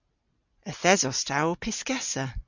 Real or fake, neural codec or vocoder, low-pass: real; none; 7.2 kHz